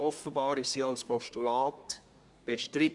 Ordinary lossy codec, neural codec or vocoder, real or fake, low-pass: Opus, 64 kbps; codec, 24 kHz, 1 kbps, SNAC; fake; 10.8 kHz